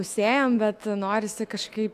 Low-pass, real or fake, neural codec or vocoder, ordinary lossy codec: 14.4 kHz; fake; autoencoder, 48 kHz, 128 numbers a frame, DAC-VAE, trained on Japanese speech; AAC, 64 kbps